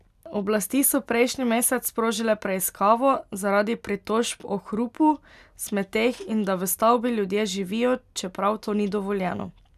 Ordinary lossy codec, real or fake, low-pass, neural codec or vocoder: none; real; 14.4 kHz; none